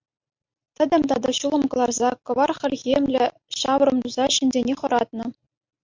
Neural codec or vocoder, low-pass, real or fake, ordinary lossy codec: none; 7.2 kHz; real; MP3, 48 kbps